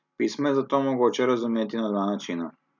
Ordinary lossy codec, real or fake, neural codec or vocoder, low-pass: none; real; none; 7.2 kHz